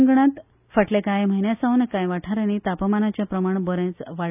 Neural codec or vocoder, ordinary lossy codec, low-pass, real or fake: none; none; 3.6 kHz; real